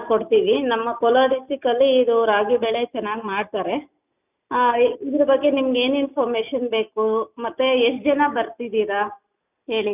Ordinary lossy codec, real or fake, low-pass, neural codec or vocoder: none; real; 3.6 kHz; none